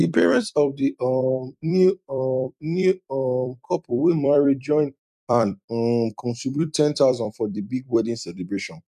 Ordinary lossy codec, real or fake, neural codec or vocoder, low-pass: none; fake; vocoder, 44.1 kHz, 128 mel bands every 256 samples, BigVGAN v2; 14.4 kHz